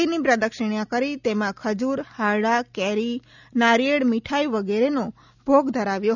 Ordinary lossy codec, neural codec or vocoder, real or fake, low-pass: none; none; real; 7.2 kHz